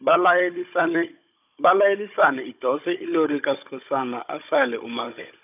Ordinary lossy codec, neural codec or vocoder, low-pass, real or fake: none; codec, 16 kHz, 16 kbps, FunCodec, trained on Chinese and English, 50 frames a second; 3.6 kHz; fake